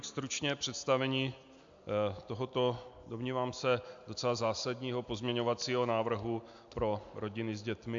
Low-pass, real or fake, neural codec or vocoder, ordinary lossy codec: 7.2 kHz; real; none; AAC, 64 kbps